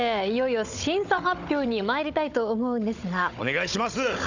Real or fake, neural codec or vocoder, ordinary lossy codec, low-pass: fake; codec, 16 kHz, 16 kbps, FunCodec, trained on Chinese and English, 50 frames a second; none; 7.2 kHz